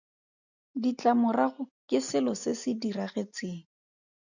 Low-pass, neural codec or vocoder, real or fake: 7.2 kHz; none; real